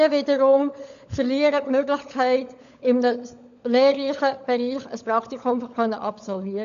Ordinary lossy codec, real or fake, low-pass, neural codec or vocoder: none; fake; 7.2 kHz; codec, 16 kHz, 16 kbps, FunCodec, trained on LibriTTS, 50 frames a second